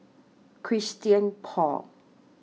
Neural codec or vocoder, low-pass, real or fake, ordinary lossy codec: none; none; real; none